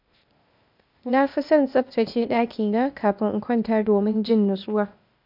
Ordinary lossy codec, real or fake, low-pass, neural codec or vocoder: MP3, 48 kbps; fake; 5.4 kHz; codec, 16 kHz, 0.8 kbps, ZipCodec